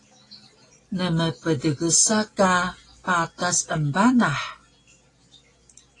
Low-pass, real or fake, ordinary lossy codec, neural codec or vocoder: 10.8 kHz; real; AAC, 32 kbps; none